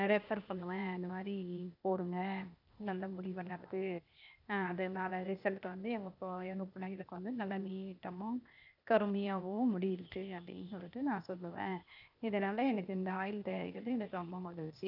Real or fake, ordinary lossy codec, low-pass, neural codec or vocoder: fake; none; 5.4 kHz; codec, 16 kHz, 0.8 kbps, ZipCodec